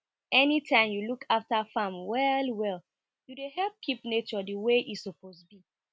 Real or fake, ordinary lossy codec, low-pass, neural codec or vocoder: real; none; none; none